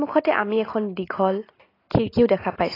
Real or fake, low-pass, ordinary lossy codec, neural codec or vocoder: real; 5.4 kHz; AAC, 24 kbps; none